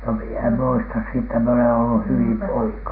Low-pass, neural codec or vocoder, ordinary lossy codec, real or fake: 5.4 kHz; none; none; real